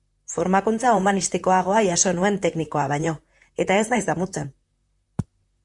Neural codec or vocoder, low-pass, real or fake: vocoder, 44.1 kHz, 128 mel bands, Pupu-Vocoder; 10.8 kHz; fake